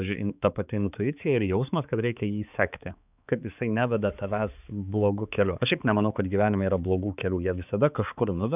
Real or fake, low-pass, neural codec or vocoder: fake; 3.6 kHz; codec, 16 kHz, 4 kbps, X-Codec, HuBERT features, trained on balanced general audio